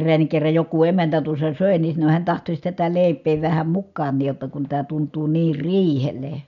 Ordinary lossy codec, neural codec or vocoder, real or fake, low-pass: none; none; real; 7.2 kHz